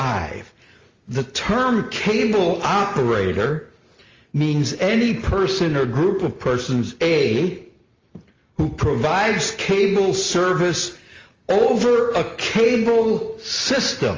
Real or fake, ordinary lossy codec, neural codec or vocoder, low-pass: real; Opus, 32 kbps; none; 7.2 kHz